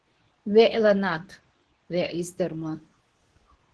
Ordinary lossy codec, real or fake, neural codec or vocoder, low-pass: Opus, 16 kbps; fake; codec, 24 kHz, 0.9 kbps, WavTokenizer, medium speech release version 2; 10.8 kHz